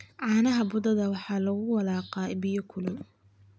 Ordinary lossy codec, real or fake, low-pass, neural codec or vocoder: none; real; none; none